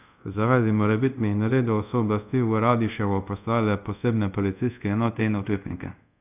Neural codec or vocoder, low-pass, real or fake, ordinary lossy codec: codec, 24 kHz, 0.5 kbps, DualCodec; 3.6 kHz; fake; none